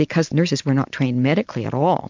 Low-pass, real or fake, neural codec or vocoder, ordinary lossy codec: 7.2 kHz; real; none; MP3, 64 kbps